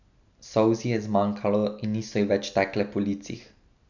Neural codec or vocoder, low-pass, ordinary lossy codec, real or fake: none; 7.2 kHz; none; real